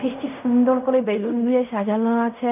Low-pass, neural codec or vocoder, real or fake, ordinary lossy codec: 3.6 kHz; codec, 16 kHz in and 24 kHz out, 0.4 kbps, LongCat-Audio-Codec, fine tuned four codebook decoder; fake; none